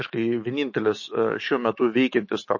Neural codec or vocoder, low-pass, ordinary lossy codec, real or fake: autoencoder, 48 kHz, 128 numbers a frame, DAC-VAE, trained on Japanese speech; 7.2 kHz; MP3, 32 kbps; fake